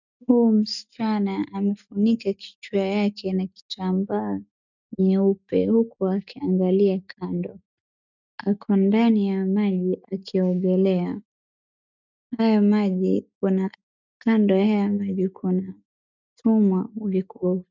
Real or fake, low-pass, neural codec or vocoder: real; 7.2 kHz; none